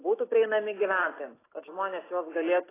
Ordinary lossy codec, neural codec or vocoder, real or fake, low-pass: AAC, 16 kbps; none; real; 3.6 kHz